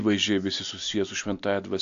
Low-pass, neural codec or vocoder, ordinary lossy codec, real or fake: 7.2 kHz; none; AAC, 64 kbps; real